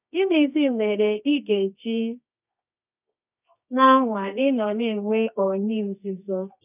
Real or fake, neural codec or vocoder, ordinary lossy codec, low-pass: fake; codec, 24 kHz, 0.9 kbps, WavTokenizer, medium music audio release; none; 3.6 kHz